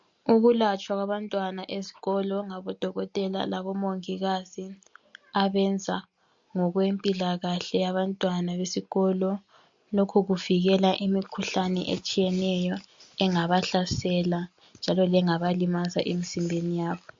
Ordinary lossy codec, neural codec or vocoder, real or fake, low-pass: MP3, 48 kbps; none; real; 7.2 kHz